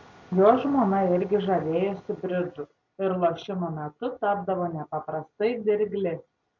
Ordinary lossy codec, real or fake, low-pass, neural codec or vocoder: MP3, 64 kbps; real; 7.2 kHz; none